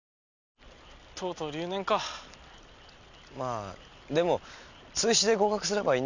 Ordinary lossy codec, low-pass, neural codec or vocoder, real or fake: none; 7.2 kHz; none; real